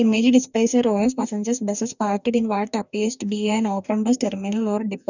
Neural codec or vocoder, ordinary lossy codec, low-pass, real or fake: codec, 44.1 kHz, 2.6 kbps, DAC; none; 7.2 kHz; fake